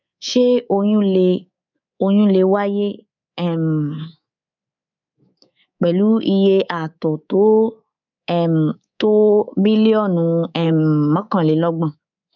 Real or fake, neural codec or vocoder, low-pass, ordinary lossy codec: fake; codec, 24 kHz, 3.1 kbps, DualCodec; 7.2 kHz; none